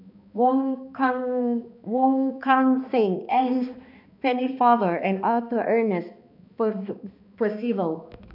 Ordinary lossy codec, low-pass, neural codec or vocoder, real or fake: none; 5.4 kHz; codec, 16 kHz, 2 kbps, X-Codec, HuBERT features, trained on balanced general audio; fake